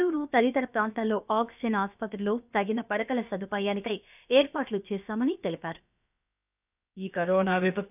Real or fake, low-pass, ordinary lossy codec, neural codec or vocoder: fake; 3.6 kHz; none; codec, 16 kHz, about 1 kbps, DyCAST, with the encoder's durations